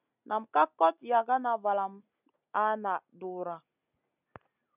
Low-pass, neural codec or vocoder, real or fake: 3.6 kHz; none; real